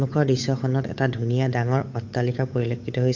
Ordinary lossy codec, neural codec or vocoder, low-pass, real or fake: MP3, 48 kbps; codec, 16 kHz, 8 kbps, FunCodec, trained on Chinese and English, 25 frames a second; 7.2 kHz; fake